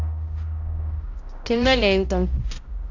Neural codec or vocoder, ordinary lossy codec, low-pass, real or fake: codec, 16 kHz, 0.5 kbps, X-Codec, HuBERT features, trained on balanced general audio; MP3, 64 kbps; 7.2 kHz; fake